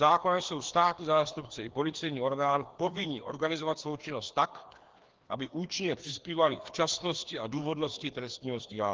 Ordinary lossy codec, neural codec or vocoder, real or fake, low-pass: Opus, 16 kbps; codec, 16 kHz, 2 kbps, FreqCodec, larger model; fake; 7.2 kHz